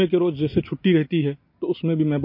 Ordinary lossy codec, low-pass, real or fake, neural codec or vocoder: MP3, 32 kbps; 5.4 kHz; real; none